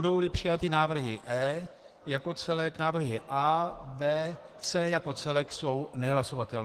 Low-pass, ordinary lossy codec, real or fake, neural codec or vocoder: 14.4 kHz; Opus, 24 kbps; fake; codec, 44.1 kHz, 2.6 kbps, SNAC